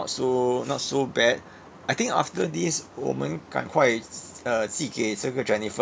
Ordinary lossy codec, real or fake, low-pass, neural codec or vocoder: none; real; none; none